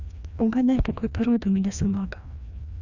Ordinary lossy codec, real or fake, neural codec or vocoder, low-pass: none; fake; codec, 16 kHz, 1 kbps, FreqCodec, larger model; 7.2 kHz